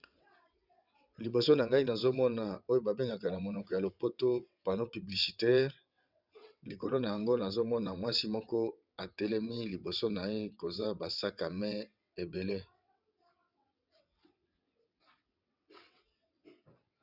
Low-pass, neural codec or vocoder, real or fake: 5.4 kHz; vocoder, 22.05 kHz, 80 mel bands, WaveNeXt; fake